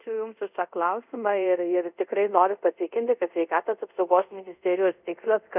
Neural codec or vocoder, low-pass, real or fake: codec, 24 kHz, 0.5 kbps, DualCodec; 3.6 kHz; fake